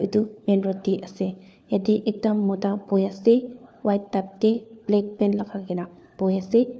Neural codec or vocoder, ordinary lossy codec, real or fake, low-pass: codec, 16 kHz, 4 kbps, FunCodec, trained on LibriTTS, 50 frames a second; none; fake; none